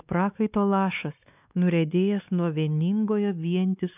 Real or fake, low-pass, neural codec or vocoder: fake; 3.6 kHz; codec, 16 kHz, 2 kbps, X-Codec, WavLM features, trained on Multilingual LibriSpeech